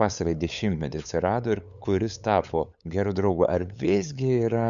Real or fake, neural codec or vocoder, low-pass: fake; codec, 16 kHz, 8 kbps, FunCodec, trained on LibriTTS, 25 frames a second; 7.2 kHz